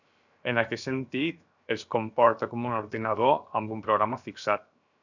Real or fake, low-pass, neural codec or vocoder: fake; 7.2 kHz; codec, 16 kHz, 0.7 kbps, FocalCodec